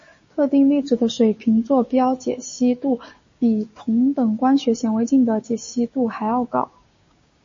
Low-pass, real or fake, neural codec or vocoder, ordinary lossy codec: 7.2 kHz; fake; codec, 16 kHz, 6 kbps, DAC; MP3, 32 kbps